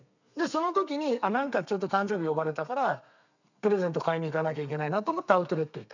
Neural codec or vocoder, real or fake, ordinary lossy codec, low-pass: codec, 32 kHz, 1.9 kbps, SNAC; fake; none; 7.2 kHz